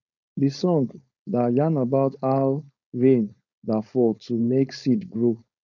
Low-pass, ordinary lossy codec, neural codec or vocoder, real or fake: 7.2 kHz; none; codec, 16 kHz, 4.8 kbps, FACodec; fake